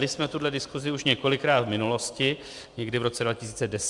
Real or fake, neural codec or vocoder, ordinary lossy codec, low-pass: fake; vocoder, 44.1 kHz, 128 mel bands every 512 samples, BigVGAN v2; Opus, 64 kbps; 10.8 kHz